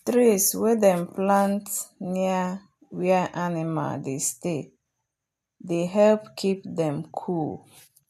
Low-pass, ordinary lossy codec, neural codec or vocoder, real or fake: 14.4 kHz; none; none; real